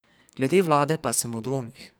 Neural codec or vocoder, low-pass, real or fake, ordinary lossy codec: codec, 44.1 kHz, 2.6 kbps, SNAC; none; fake; none